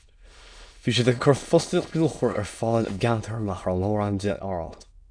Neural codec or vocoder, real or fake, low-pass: autoencoder, 22.05 kHz, a latent of 192 numbers a frame, VITS, trained on many speakers; fake; 9.9 kHz